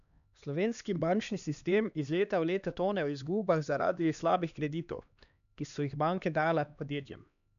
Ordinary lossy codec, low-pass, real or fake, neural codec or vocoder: none; 7.2 kHz; fake; codec, 16 kHz, 2 kbps, X-Codec, HuBERT features, trained on LibriSpeech